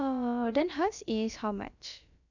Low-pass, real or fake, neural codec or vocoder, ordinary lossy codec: 7.2 kHz; fake; codec, 16 kHz, about 1 kbps, DyCAST, with the encoder's durations; none